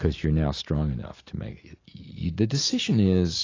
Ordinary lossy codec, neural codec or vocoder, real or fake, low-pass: AAC, 32 kbps; none; real; 7.2 kHz